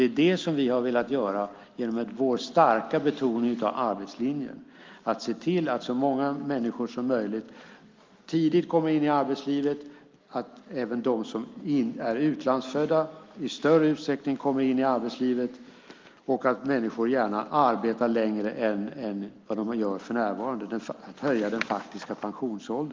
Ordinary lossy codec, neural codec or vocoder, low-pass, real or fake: Opus, 32 kbps; none; 7.2 kHz; real